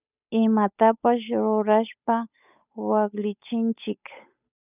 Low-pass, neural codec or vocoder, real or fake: 3.6 kHz; codec, 16 kHz, 8 kbps, FunCodec, trained on Chinese and English, 25 frames a second; fake